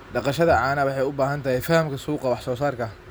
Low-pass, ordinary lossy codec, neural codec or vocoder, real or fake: none; none; none; real